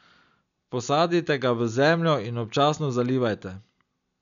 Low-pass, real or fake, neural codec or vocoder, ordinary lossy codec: 7.2 kHz; real; none; none